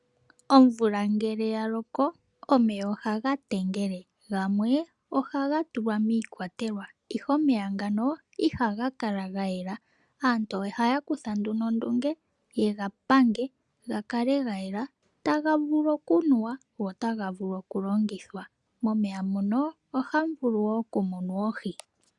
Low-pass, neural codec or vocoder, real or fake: 10.8 kHz; none; real